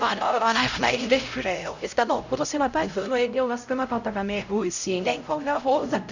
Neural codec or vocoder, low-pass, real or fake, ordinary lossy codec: codec, 16 kHz, 0.5 kbps, X-Codec, HuBERT features, trained on LibriSpeech; 7.2 kHz; fake; none